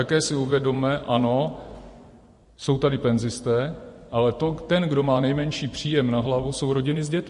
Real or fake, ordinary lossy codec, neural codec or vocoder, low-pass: fake; MP3, 48 kbps; vocoder, 24 kHz, 100 mel bands, Vocos; 10.8 kHz